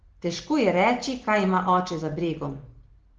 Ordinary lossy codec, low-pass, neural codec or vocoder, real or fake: Opus, 16 kbps; 7.2 kHz; none; real